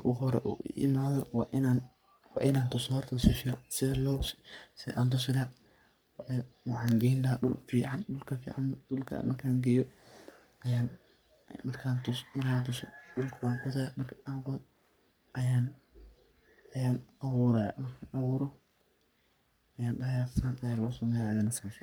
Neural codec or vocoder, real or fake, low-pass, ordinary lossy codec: codec, 44.1 kHz, 3.4 kbps, Pupu-Codec; fake; none; none